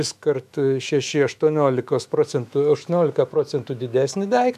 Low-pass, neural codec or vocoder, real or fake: 14.4 kHz; autoencoder, 48 kHz, 128 numbers a frame, DAC-VAE, trained on Japanese speech; fake